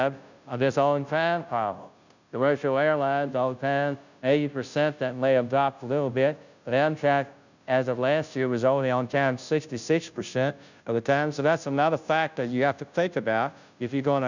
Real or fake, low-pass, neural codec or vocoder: fake; 7.2 kHz; codec, 16 kHz, 0.5 kbps, FunCodec, trained on Chinese and English, 25 frames a second